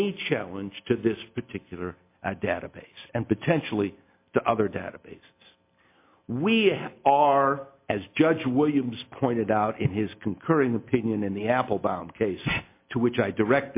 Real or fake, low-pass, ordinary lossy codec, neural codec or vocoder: real; 3.6 kHz; MP3, 24 kbps; none